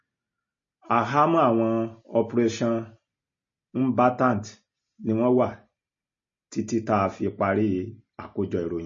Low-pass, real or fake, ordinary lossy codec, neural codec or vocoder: 7.2 kHz; real; MP3, 32 kbps; none